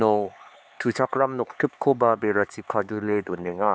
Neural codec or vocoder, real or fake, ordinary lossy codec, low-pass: codec, 16 kHz, 4 kbps, X-Codec, HuBERT features, trained on LibriSpeech; fake; none; none